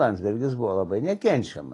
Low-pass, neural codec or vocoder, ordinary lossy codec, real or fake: 10.8 kHz; none; AAC, 32 kbps; real